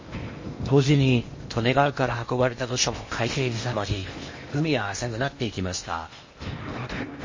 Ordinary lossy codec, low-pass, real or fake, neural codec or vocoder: MP3, 32 kbps; 7.2 kHz; fake; codec, 16 kHz in and 24 kHz out, 0.8 kbps, FocalCodec, streaming, 65536 codes